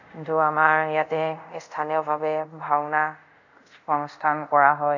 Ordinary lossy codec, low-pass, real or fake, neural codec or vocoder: none; 7.2 kHz; fake; codec, 24 kHz, 0.5 kbps, DualCodec